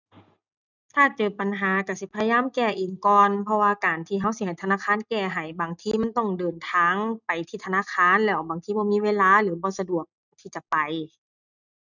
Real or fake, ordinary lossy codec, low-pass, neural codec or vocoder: real; none; 7.2 kHz; none